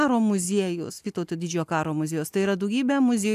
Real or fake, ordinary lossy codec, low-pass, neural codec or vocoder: real; AAC, 96 kbps; 14.4 kHz; none